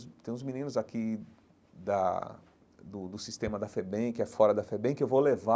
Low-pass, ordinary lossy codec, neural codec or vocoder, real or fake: none; none; none; real